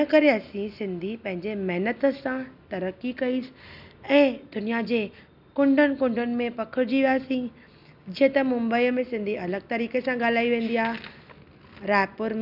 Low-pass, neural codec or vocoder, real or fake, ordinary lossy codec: 5.4 kHz; none; real; none